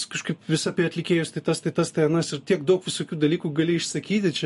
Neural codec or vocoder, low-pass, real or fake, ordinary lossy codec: none; 14.4 kHz; real; MP3, 48 kbps